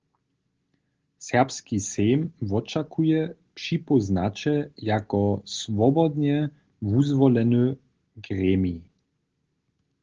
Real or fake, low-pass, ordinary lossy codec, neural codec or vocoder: real; 7.2 kHz; Opus, 16 kbps; none